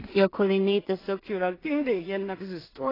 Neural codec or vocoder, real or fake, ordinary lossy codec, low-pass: codec, 16 kHz in and 24 kHz out, 0.4 kbps, LongCat-Audio-Codec, two codebook decoder; fake; AAC, 24 kbps; 5.4 kHz